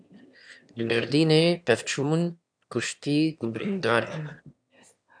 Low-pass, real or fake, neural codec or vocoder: 9.9 kHz; fake; autoencoder, 22.05 kHz, a latent of 192 numbers a frame, VITS, trained on one speaker